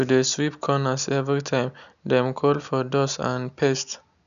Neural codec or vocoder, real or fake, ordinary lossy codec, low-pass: none; real; none; 7.2 kHz